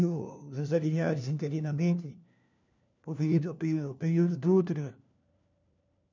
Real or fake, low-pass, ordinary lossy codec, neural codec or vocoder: fake; 7.2 kHz; none; codec, 16 kHz, 1 kbps, FunCodec, trained on LibriTTS, 50 frames a second